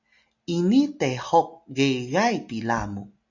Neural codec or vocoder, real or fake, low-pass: none; real; 7.2 kHz